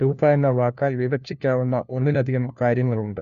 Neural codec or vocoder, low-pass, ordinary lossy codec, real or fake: codec, 16 kHz, 1 kbps, FunCodec, trained on LibriTTS, 50 frames a second; 7.2 kHz; none; fake